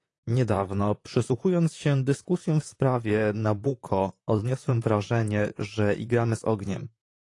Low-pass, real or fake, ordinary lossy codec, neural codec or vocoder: 10.8 kHz; fake; AAC, 48 kbps; vocoder, 44.1 kHz, 128 mel bands, Pupu-Vocoder